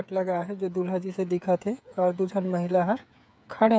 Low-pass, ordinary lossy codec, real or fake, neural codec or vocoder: none; none; fake; codec, 16 kHz, 8 kbps, FreqCodec, smaller model